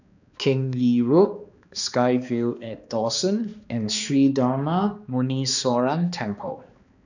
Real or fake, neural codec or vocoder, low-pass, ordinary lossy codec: fake; codec, 16 kHz, 2 kbps, X-Codec, HuBERT features, trained on balanced general audio; 7.2 kHz; none